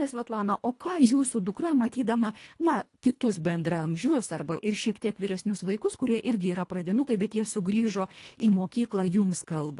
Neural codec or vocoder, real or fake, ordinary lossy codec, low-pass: codec, 24 kHz, 1.5 kbps, HILCodec; fake; AAC, 48 kbps; 10.8 kHz